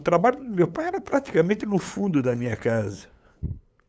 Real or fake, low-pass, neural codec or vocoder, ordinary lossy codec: fake; none; codec, 16 kHz, 8 kbps, FunCodec, trained on LibriTTS, 25 frames a second; none